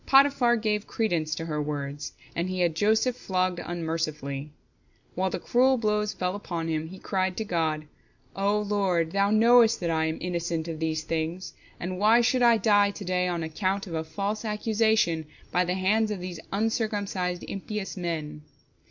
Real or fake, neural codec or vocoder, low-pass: real; none; 7.2 kHz